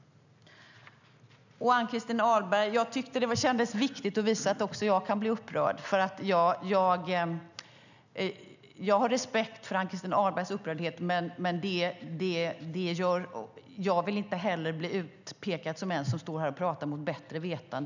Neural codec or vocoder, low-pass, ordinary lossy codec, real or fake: none; 7.2 kHz; none; real